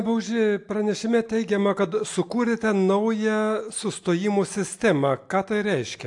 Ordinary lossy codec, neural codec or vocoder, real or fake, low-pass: AAC, 64 kbps; none; real; 10.8 kHz